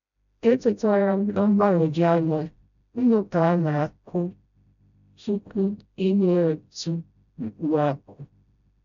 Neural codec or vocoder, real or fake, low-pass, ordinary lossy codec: codec, 16 kHz, 0.5 kbps, FreqCodec, smaller model; fake; 7.2 kHz; none